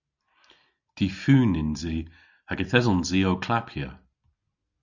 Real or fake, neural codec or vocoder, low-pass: real; none; 7.2 kHz